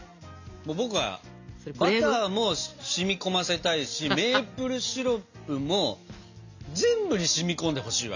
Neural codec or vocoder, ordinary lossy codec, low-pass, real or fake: none; none; 7.2 kHz; real